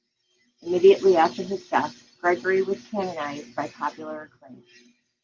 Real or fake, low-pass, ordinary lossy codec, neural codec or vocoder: real; 7.2 kHz; Opus, 24 kbps; none